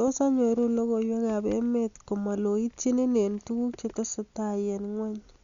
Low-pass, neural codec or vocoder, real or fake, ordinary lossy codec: 7.2 kHz; none; real; Opus, 64 kbps